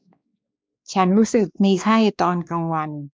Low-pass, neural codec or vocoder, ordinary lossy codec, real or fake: none; codec, 16 kHz, 2 kbps, X-Codec, WavLM features, trained on Multilingual LibriSpeech; none; fake